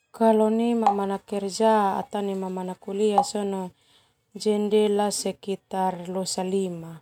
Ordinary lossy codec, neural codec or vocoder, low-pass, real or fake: none; none; 19.8 kHz; real